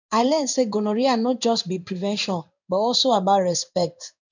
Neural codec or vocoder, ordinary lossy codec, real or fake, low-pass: codec, 16 kHz in and 24 kHz out, 1 kbps, XY-Tokenizer; none; fake; 7.2 kHz